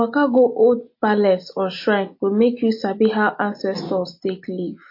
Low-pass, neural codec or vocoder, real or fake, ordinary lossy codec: 5.4 kHz; none; real; MP3, 32 kbps